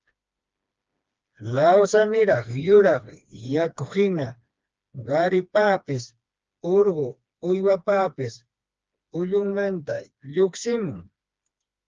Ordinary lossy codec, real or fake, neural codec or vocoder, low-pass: Opus, 24 kbps; fake; codec, 16 kHz, 2 kbps, FreqCodec, smaller model; 7.2 kHz